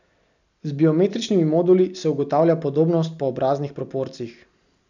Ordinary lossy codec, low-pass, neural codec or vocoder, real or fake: none; 7.2 kHz; none; real